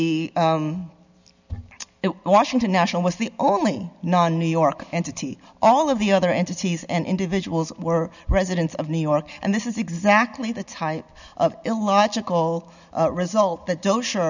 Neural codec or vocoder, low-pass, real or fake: none; 7.2 kHz; real